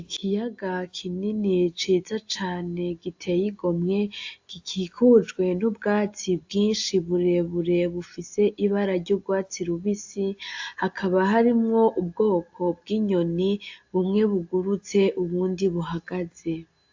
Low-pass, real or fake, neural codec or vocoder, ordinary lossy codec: 7.2 kHz; real; none; AAC, 48 kbps